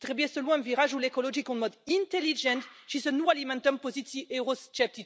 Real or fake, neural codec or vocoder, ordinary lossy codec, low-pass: real; none; none; none